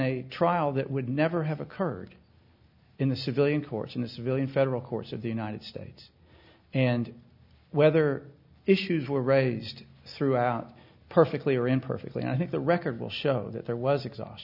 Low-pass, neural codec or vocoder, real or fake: 5.4 kHz; none; real